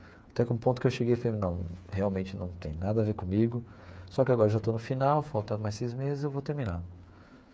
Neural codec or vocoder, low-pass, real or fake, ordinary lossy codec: codec, 16 kHz, 8 kbps, FreqCodec, smaller model; none; fake; none